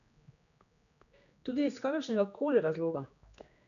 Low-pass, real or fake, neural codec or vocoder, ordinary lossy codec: 7.2 kHz; fake; codec, 16 kHz, 2 kbps, X-Codec, HuBERT features, trained on general audio; none